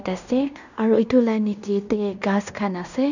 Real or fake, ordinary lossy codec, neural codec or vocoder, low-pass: fake; none; codec, 16 kHz in and 24 kHz out, 0.9 kbps, LongCat-Audio-Codec, fine tuned four codebook decoder; 7.2 kHz